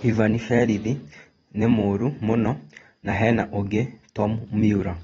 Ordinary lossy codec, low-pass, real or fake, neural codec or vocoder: AAC, 24 kbps; 19.8 kHz; fake; vocoder, 44.1 kHz, 128 mel bands every 256 samples, BigVGAN v2